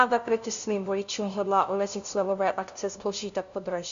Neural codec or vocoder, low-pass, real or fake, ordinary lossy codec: codec, 16 kHz, 0.5 kbps, FunCodec, trained on LibriTTS, 25 frames a second; 7.2 kHz; fake; MP3, 64 kbps